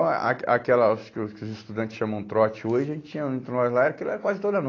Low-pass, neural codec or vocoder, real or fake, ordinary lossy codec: 7.2 kHz; none; real; AAC, 32 kbps